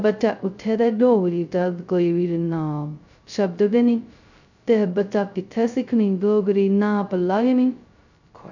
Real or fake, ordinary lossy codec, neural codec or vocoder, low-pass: fake; none; codec, 16 kHz, 0.2 kbps, FocalCodec; 7.2 kHz